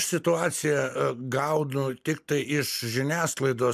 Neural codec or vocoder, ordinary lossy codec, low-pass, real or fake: none; MP3, 96 kbps; 14.4 kHz; real